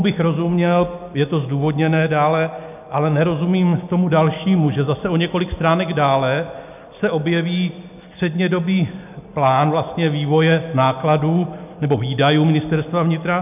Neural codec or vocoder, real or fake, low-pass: none; real; 3.6 kHz